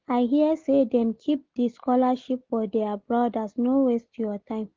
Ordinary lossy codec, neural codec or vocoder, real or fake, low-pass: Opus, 16 kbps; none; real; 7.2 kHz